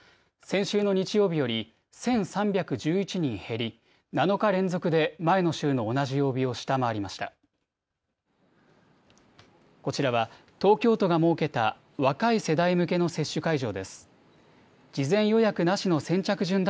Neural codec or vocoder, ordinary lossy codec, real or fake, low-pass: none; none; real; none